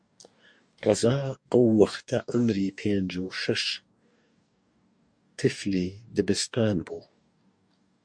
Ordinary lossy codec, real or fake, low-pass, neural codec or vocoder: MP3, 64 kbps; fake; 9.9 kHz; codec, 44.1 kHz, 2.6 kbps, DAC